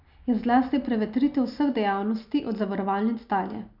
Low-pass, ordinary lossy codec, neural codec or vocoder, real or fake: 5.4 kHz; none; none; real